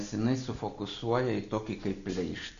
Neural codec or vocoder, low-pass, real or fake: none; 7.2 kHz; real